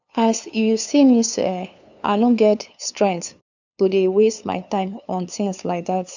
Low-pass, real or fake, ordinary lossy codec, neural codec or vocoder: 7.2 kHz; fake; none; codec, 16 kHz, 2 kbps, FunCodec, trained on LibriTTS, 25 frames a second